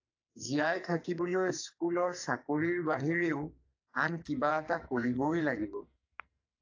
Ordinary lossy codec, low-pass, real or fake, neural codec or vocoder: AAC, 48 kbps; 7.2 kHz; fake; codec, 44.1 kHz, 2.6 kbps, SNAC